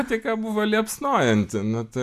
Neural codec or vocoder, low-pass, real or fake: none; 14.4 kHz; real